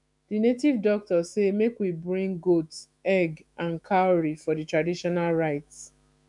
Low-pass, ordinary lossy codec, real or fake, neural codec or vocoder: 10.8 kHz; none; fake; autoencoder, 48 kHz, 128 numbers a frame, DAC-VAE, trained on Japanese speech